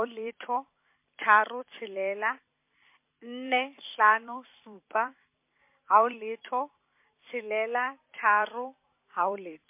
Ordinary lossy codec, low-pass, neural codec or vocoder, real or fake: MP3, 24 kbps; 3.6 kHz; vocoder, 44.1 kHz, 128 mel bands every 256 samples, BigVGAN v2; fake